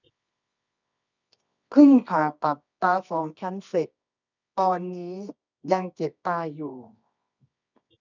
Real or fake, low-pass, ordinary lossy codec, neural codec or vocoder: fake; 7.2 kHz; none; codec, 24 kHz, 0.9 kbps, WavTokenizer, medium music audio release